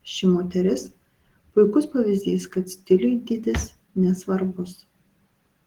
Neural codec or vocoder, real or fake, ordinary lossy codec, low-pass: none; real; Opus, 16 kbps; 19.8 kHz